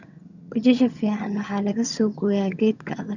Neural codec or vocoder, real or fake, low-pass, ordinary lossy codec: vocoder, 22.05 kHz, 80 mel bands, HiFi-GAN; fake; 7.2 kHz; none